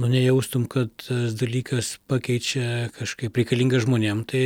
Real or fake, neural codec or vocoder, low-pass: real; none; 19.8 kHz